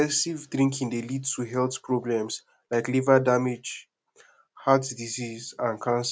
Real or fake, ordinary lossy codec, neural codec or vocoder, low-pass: real; none; none; none